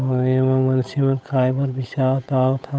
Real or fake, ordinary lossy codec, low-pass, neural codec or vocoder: fake; none; none; codec, 16 kHz, 8 kbps, FunCodec, trained on Chinese and English, 25 frames a second